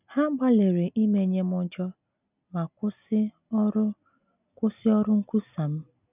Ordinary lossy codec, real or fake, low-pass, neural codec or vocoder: none; real; 3.6 kHz; none